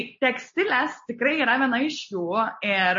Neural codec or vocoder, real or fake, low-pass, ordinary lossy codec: none; real; 7.2 kHz; MP3, 32 kbps